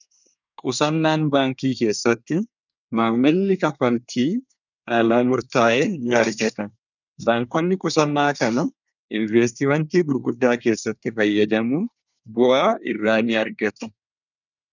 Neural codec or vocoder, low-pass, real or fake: codec, 24 kHz, 1 kbps, SNAC; 7.2 kHz; fake